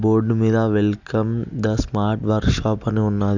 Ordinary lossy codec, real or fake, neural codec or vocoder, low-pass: none; real; none; 7.2 kHz